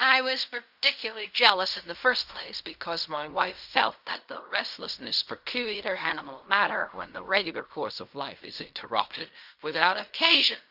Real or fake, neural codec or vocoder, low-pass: fake; codec, 16 kHz in and 24 kHz out, 0.4 kbps, LongCat-Audio-Codec, fine tuned four codebook decoder; 5.4 kHz